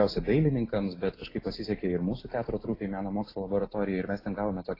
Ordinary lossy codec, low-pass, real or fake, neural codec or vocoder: AAC, 24 kbps; 5.4 kHz; real; none